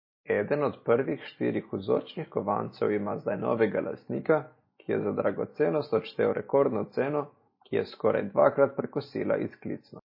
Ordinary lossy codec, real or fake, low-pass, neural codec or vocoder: MP3, 24 kbps; real; 5.4 kHz; none